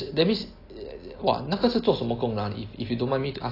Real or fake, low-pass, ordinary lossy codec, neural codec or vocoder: real; 5.4 kHz; AAC, 24 kbps; none